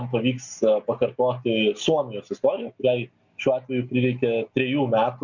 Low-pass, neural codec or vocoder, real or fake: 7.2 kHz; none; real